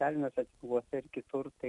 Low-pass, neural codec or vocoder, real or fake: 10.8 kHz; none; real